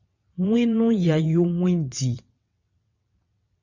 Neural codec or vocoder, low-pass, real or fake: vocoder, 22.05 kHz, 80 mel bands, WaveNeXt; 7.2 kHz; fake